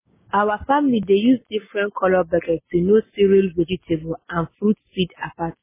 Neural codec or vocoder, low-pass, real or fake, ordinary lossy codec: none; 3.6 kHz; real; MP3, 16 kbps